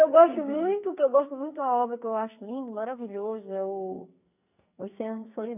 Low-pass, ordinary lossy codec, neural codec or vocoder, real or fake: 3.6 kHz; none; codec, 44.1 kHz, 2.6 kbps, SNAC; fake